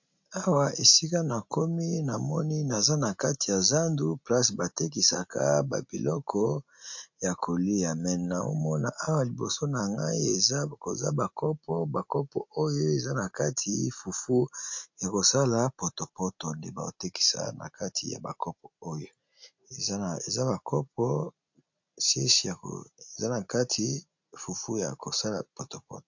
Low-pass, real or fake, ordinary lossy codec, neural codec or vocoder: 7.2 kHz; real; MP3, 48 kbps; none